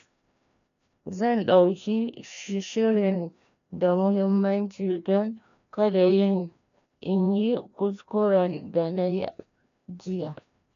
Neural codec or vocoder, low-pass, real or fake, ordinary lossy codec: codec, 16 kHz, 1 kbps, FreqCodec, larger model; 7.2 kHz; fake; none